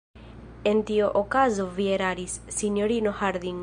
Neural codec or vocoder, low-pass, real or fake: none; 9.9 kHz; real